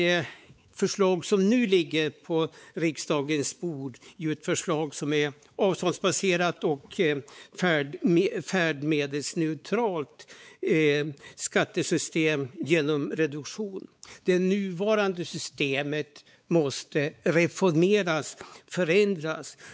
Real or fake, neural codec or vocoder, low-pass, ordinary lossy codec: fake; codec, 16 kHz, 4 kbps, X-Codec, WavLM features, trained on Multilingual LibriSpeech; none; none